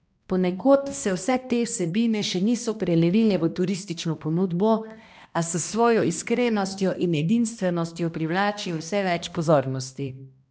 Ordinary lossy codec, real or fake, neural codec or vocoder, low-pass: none; fake; codec, 16 kHz, 1 kbps, X-Codec, HuBERT features, trained on balanced general audio; none